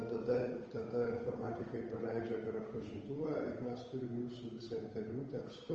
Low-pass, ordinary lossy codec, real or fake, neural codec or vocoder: 7.2 kHz; Opus, 24 kbps; fake; codec, 16 kHz, 8 kbps, FunCodec, trained on Chinese and English, 25 frames a second